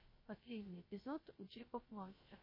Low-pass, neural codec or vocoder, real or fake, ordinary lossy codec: 5.4 kHz; codec, 16 kHz, 0.3 kbps, FocalCodec; fake; MP3, 24 kbps